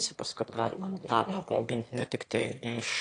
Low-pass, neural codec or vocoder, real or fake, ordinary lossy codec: 9.9 kHz; autoencoder, 22.05 kHz, a latent of 192 numbers a frame, VITS, trained on one speaker; fake; AAC, 48 kbps